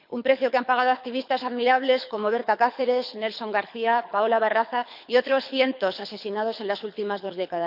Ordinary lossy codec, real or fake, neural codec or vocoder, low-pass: none; fake; codec, 24 kHz, 6 kbps, HILCodec; 5.4 kHz